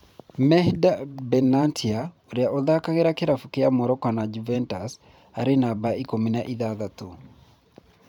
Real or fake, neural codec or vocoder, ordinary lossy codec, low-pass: fake; vocoder, 44.1 kHz, 128 mel bands every 512 samples, BigVGAN v2; none; 19.8 kHz